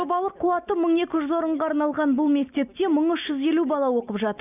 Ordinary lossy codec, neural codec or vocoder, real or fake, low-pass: none; none; real; 3.6 kHz